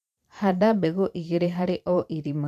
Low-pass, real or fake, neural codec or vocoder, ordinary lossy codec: 14.4 kHz; fake; vocoder, 44.1 kHz, 128 mel bands every 512 samples, BigVGAN v2; none